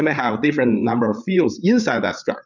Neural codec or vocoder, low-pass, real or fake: codec, 16 kHz, 8 kbps, FreqCodec, larger model; 7.2 kHz; fake